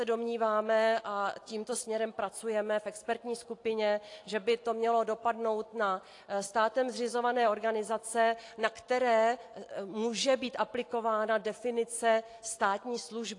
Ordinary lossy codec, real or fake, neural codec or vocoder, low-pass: AAC, 48 kbps; real; none; 10.8 kHz